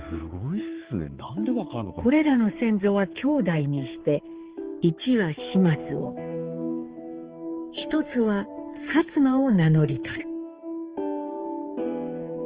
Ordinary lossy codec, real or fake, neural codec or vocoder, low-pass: Opus, 16 kbps; fake; codec, 16 kHz, 4 kbps, X-Codec, HuBERT features, trained on balanced general audio; 3.6 kHz